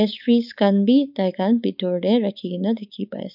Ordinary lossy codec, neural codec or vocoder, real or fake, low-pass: none; codec, 16 kHz, 4.8 kbps, FACodec; fake; 5.4 kHz